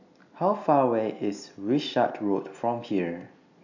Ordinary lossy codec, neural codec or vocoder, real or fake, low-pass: none; none; real; 7.2 kHz